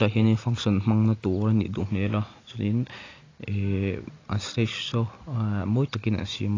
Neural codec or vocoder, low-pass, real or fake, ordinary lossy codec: none; 7.2 kHz; real; AAC, 32 kbps